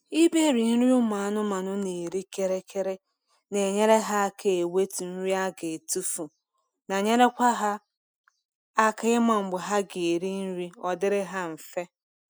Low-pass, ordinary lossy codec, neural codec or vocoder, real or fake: none; none; none; real